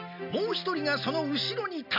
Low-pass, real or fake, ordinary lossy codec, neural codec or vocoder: 5.4 kHz; real; none; none